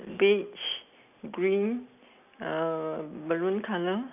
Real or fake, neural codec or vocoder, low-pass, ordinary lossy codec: real; none; 3.6 kHz; none